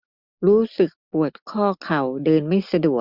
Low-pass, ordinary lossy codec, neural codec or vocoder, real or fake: 5.4 kHz; Opus, 64 kbps; none; real